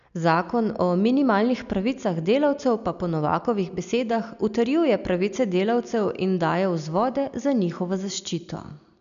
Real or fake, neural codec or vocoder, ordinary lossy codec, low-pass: real; none; none; 7.2 kHz